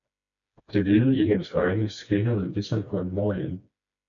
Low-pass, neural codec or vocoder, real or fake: 7.2 kHz; codec, 16 kHz, 2 kbps, FreqCodec, smaller model; fake